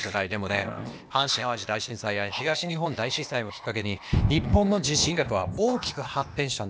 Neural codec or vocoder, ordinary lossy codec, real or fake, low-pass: codec, 16 kHz, 0.8 kbps, ZipCodec; none; fake; none